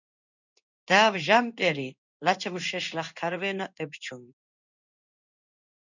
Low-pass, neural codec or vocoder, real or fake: 7.2 kHz; codec, 16 kHz in and 24 kHz out, 1 kbps, XY-Tokenizer; fake